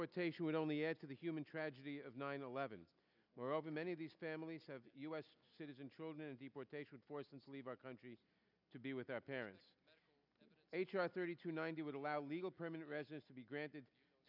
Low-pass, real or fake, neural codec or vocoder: 5.4 kHz; real; none